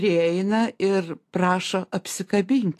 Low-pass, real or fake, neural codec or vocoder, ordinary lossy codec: 14.4 kHz; real; none; AAC, 64 kbps